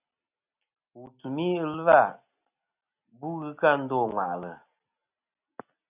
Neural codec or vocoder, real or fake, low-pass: none; real; 3.6 kHz